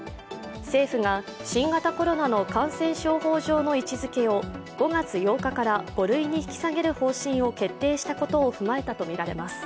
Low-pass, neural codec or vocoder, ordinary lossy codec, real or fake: none; none; none; real